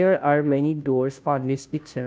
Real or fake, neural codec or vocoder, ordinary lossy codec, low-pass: fake; codec, 16 kHz, 0.5 kbps, FunCodec, trained on Chinese and English, 25 frames a second; none; none